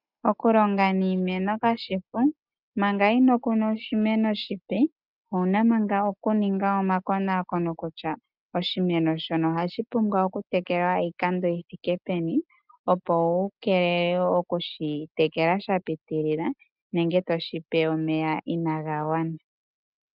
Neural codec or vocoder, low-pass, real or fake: none; 5.4 kHz; real